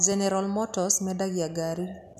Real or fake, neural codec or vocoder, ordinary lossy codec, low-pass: real; none; none; 14.4 kHz